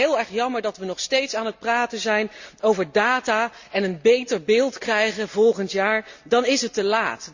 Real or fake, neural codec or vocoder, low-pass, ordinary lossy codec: real; none; 7.2 kHz; Opus, 64 kbps